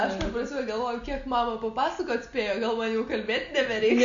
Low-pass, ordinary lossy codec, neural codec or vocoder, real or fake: 7.2 kHz; Opus, 64 kbps; none; real